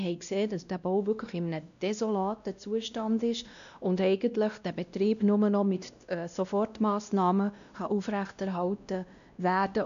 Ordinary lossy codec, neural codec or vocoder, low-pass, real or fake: none; codec, 16 kHz, 1 kbps, X-Codec, WavLM features, trained on Multilingual LibriSpeech; 7.2 kHz; fake